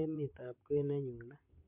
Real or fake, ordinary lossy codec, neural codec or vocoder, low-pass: fake; MP3, 32 kbps; vocoder, 44.1 kHz, 128 mel bands, Pupu-Vocoder; 3.6 kHz